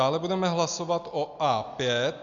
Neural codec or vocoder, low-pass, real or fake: none; 7.2 kHz; real